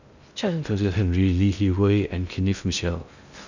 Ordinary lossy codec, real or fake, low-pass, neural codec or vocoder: none; fake; 7.2 kHz; codec, 16 kHz in and 24 kHz out, 0.6 kbps, FocalCodec, streaming, 2048 codes